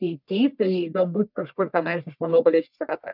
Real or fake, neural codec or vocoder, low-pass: fake; codec, 44.1 kHz, 1.7 kbps, Pupu-Codec; 5.4 kHz